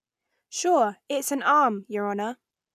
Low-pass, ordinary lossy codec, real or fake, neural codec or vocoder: 14.4 kHz; none; real; none